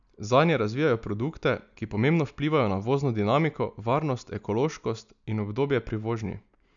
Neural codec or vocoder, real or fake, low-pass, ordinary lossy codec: none; real; 7.2 kHz; none